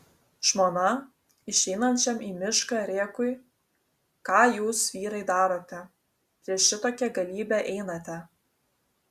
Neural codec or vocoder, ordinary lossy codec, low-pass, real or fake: none; Opus, 64 kbps; 14.4 kHz; real